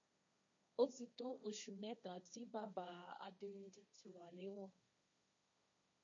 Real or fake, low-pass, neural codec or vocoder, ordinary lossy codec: fake; 7.2 kHz; codec, 16 kHz, 1.1 kbps, Voila-Tokenizer; MP3, 48 kbps